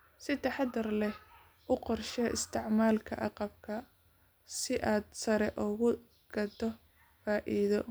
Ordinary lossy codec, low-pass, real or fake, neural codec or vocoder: none; none; real; none